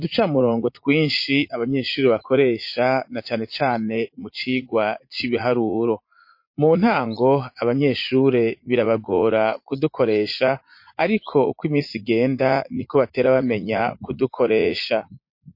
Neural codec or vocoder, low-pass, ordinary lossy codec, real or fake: vocoder, 44.1 kHz, 80 mel bands, Vocos; 5.4 kHz; MP3, 32 kbps; fake